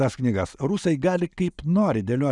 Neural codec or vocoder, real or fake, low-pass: none; real; 10.8 kHz